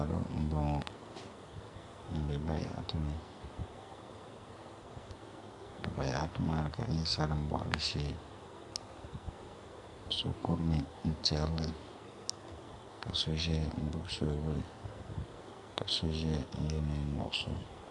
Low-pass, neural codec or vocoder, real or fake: 10.8 kHz; codec, 44.1 kHz, 2.6 kbps, SNAC; fake